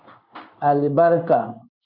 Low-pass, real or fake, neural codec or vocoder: 5.4 kHz; fake; codec, 16 kHz in and 24 kHz out, 1 kbps, XY-Tokenizer